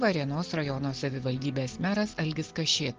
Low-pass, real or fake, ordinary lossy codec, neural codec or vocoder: 7.2 kHz; real; Opus, 16 kbps; none